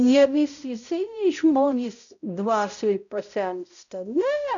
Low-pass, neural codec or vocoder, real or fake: 7.2 kHz; codec, 16 kHz, 0.5 kbps, X-Codec, HuBERT features, trained on balanced general audio; fake